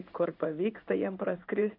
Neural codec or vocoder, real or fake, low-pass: codec, 16 kHz in and 24 kHz out, 1 kbps, XY-Tokenizer; fake; 5.4 kHz